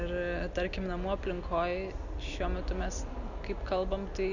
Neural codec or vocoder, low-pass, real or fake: none; 7.2 kHz; real